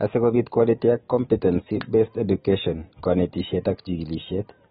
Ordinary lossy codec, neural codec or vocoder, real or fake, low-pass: AAC, 16 kbps; none; real; 9.9 kHz